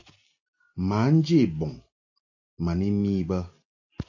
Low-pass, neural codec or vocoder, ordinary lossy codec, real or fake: 7.2 kHz; none; AAC, 48 kbps; real